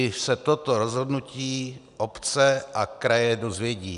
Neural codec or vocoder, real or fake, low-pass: none; real; 10.8 kHz